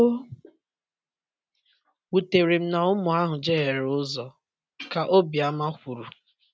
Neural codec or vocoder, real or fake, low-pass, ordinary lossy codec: none; real; none; none